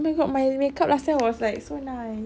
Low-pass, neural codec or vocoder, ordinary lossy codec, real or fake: none; none; none; real